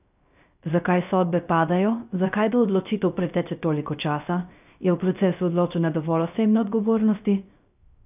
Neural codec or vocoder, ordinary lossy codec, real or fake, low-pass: codec, 16 kHz, 0.3 kbps, FocalCodec; none; fake; 3.6 kHz